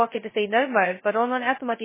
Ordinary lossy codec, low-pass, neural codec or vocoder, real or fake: MP3, 16 kbps; 3.6 kHz; codec, 16 kHz, 0.2 kbps, FocalCodec; fake